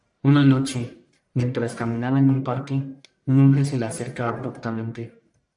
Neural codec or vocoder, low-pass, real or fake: codec, 44.1 kHz, 1.7 kbps, Pupu-Codec; 10.8 kHz; fake